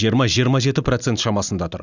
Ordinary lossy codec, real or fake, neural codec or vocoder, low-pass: none; real; none; 7.2 kHz